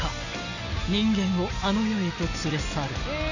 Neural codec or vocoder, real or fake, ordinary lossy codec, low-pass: none; real; none; 7.2 kHz